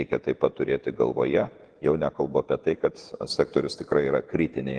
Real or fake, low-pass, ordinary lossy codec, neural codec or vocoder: fake; 9.9 kHz; Opus, 16 kbps; vocoder, 48 kHz, 128 mel bands, Vocos